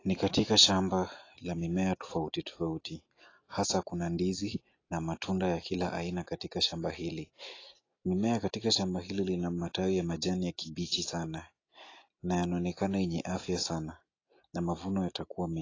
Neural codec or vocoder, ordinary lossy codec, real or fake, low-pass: none; AAC, 32 kbps; real; 7.2 kHz